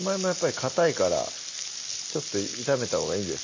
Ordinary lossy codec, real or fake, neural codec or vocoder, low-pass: MP3, 32 kbps; real; none; 7.2 kHz